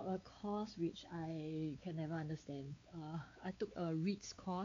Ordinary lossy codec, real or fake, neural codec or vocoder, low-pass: MP3, 48 kbps; fake; codec, 16 kHz, 4 kbps, X-Codec, WavLM features, trained on Multilingual LibriSpeech; 7.2 kHz